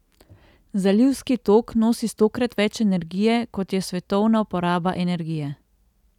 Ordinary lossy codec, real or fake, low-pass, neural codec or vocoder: none; real; 19.8 kHz; none